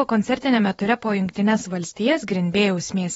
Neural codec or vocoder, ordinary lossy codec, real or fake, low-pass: vocoder, 24 kHz, 100 mel bands, Vocos; AAC, 24 kbps; fake; 10.8 kHz